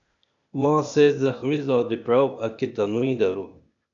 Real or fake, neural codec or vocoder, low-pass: fake; codec, 16 kHz, 0.8 kbps, ZipCodec; 7.2 kHz